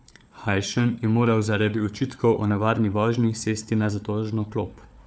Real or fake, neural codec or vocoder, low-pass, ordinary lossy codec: fake; codec, 16 kHz, 4 kbps, FunCodec, trained on Chinese and English, 50 frames a second; none; none